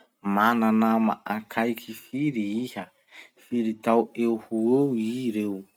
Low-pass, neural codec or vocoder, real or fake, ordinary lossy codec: 19.8 kHz; none; real; none